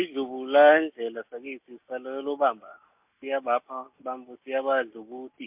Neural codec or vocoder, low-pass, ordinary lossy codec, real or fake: none; 3.6 kHz; MP3, 32 kbps; real